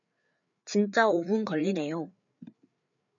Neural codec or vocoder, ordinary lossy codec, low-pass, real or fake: codec, 16 kHz, 4 kbps, FreqCodec, larger model; MP3, 96 kbps; 7.2 kHz; fake